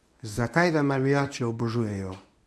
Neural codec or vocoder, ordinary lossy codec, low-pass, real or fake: codec, 24 kHz, 0.9 kbps, WavTokenizer, medium speech release version 1; none; none; fake